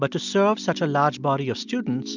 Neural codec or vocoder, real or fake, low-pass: none; real; 7.2 kHz